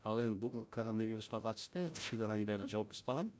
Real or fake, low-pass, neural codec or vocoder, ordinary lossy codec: fake; none; codec, 16 kHz, 0.5 kbps, FreqCodec, larger model; none